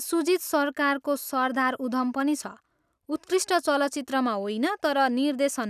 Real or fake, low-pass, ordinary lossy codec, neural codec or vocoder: real; 14.4 kHz; none; none